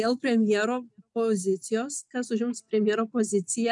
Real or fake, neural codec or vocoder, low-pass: real; none; 10.8 kHz